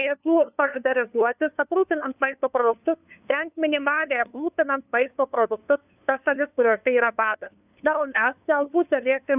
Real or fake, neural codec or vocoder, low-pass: fake; codec, 16 kHz, 2 kbps, FunCodec, trained on LibriTTS, 25 frames a second; 3.6 kHz